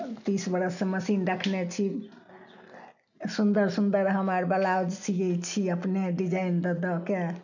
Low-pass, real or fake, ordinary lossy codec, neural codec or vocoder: 7.2 kHz; real; none; none